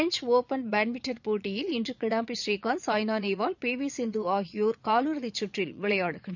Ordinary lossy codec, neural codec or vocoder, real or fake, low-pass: none; vocoder, 22.05 kHz, 80 mel bands, Vocos; fake; 7.2 kHz